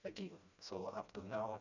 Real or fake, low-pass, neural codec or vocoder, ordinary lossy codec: fake; 7.2 kHz; codec, 16 kHz, 1 kbps, FreqCodec, smaller model; none